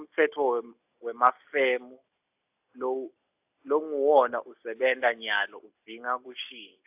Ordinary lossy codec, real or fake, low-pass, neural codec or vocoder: AAC, 32 kbps; real; 3.6 kHz; none